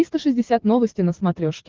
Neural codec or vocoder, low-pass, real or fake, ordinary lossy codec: codec, 44.1 kHz, 7.8 kbps, DAC; 7.2 kHz; fake; Opus, 16 kbps